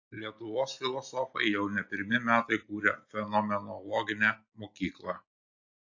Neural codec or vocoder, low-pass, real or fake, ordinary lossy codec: none; 7.2 kHz; real; AAC, 48 kbps